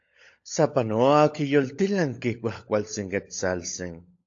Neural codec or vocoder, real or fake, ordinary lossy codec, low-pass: codec, 16 kHz, 16 kbps, FunCodec, trained on LibriTTS, 50 frames a second; fake; AAC, 48 kbps; 7.2 kHz